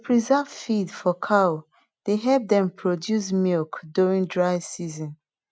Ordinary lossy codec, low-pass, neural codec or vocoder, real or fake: none; none; none; real